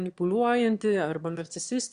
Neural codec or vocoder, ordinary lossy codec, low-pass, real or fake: autoencoder, 22.05 kHz, a latent of 192 numbers a frame, VITS, trained on one speaker; Opus, 64 kbps; 9.9 kHz; fake